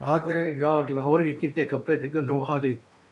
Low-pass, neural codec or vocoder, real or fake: 10.8 kHz; codec, 16 kHz in and 24 kHz out, 0.8 kbps, FocalCodec, streaming, 65536 codes; fake